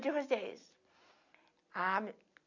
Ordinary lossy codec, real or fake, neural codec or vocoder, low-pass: none; real; none; 7.2 kHz